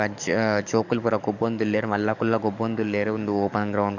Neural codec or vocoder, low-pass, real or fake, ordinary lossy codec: codec, 44.1 kHz, 7.8 kbps, DAC; 7.2 kHz; fake; none